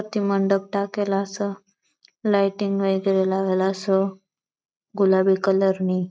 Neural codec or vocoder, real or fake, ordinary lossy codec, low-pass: none; real; none; none